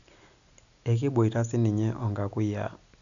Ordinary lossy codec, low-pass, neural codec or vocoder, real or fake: none; 7.2 kHz; none; real